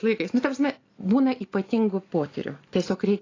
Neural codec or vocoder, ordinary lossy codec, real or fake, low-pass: none; AAC, 32 kbps; real; 7.2 kHz